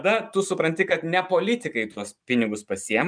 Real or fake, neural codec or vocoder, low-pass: real; none; 9.9 kHz